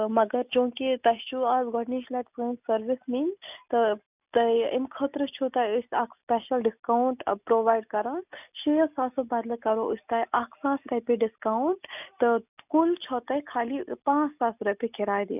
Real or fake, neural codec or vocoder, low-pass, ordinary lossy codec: real; none; 3.6 kHz; none